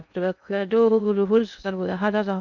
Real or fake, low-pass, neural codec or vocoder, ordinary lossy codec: fake; 7.2 kHz; codec, 16 kHz in and 24 kHz out, 0.6 kbps, FocalCodec, streaming, 2048 codes; none